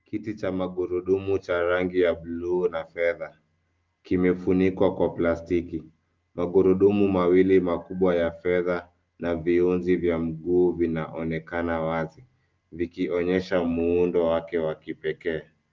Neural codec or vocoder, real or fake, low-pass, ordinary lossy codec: none; real; 7.2 kHz; Opus, 32 kbps